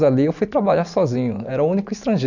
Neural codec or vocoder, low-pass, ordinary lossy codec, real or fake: none; 7.2 kHz; none; real